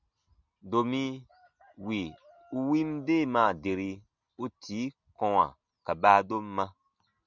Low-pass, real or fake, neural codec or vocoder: 7.2 kHz; real; none